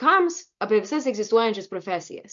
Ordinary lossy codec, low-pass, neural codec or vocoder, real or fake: MP3, 48 kbps; 7.2 kHz; none; real